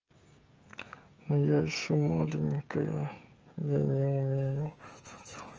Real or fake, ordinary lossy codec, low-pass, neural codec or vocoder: fake; Opus, 24 kbps; 7.2 kHz; codec, 16 kHz, 16 kbps, FreqCodec, smaller model